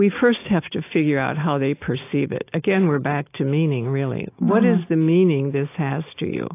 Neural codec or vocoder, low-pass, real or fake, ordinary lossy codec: none; 3.6 kHz; real; AAC, 24 kbps